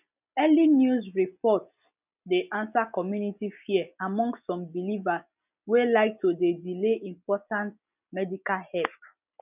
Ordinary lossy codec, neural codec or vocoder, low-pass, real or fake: none; none; 3.6 kHz; real